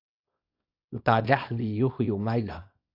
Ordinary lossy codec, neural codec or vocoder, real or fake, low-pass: AAC, 48 kbps; codec, 24 kHz, 0.9 kbps, WavTokenizer, small release; fake; 5.4 kHz